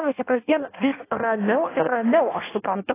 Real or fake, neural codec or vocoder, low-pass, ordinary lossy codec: fake; codec, 16 kHz in and 24 kHz out, 0.6 kbps, FireRedTTS-2 codec; 3.6 kHz; AAC, 16 kbps